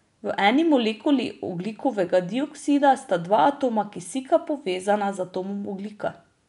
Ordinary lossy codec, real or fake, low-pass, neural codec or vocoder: none; real; 10.8 kHz; none